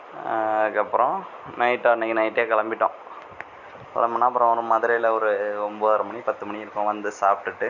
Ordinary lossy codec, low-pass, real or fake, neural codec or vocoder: none; 7.2 kHz; real; none